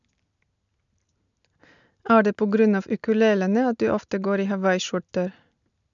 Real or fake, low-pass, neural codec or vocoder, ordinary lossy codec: real; 7.2 kHz; none; none